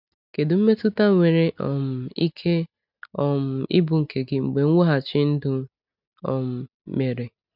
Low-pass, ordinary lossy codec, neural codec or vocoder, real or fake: 5.4 kHz; none; none; real